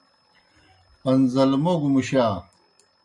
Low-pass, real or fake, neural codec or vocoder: 10.8 kHz; real; none